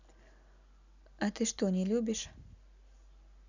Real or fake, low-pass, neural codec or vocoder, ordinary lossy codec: real; 7.2 kHz; none; none